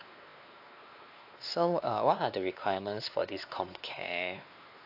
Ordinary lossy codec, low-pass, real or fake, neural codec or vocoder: AAC, 48 kbps; 5.4 kHz; fake; codec, 16 kHz, 2 kbps, X-Codec, WavLM features, trained on Multilingual LibriSpeech